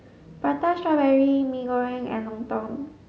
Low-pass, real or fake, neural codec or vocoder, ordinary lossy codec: none; real; none; none